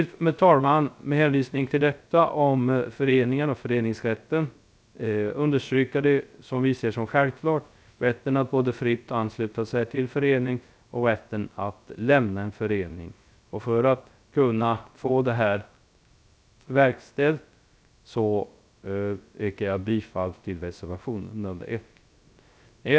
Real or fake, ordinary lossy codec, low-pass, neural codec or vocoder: fake; none; none; codec, 16 kHz, 0.3 kbps, FocalCodec